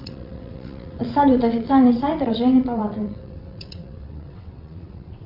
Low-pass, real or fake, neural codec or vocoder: 5.4 kHz; fake; vocoder, 22.05 kHz, 80 mel bands, WaveNeXt